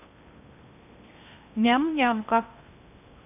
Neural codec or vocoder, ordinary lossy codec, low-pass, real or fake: codec, 16 kHz in and 24 kHz out, 0.8 kbps, FocalCodec, streaming, 65536 codes; none; 3.6 kHz; fake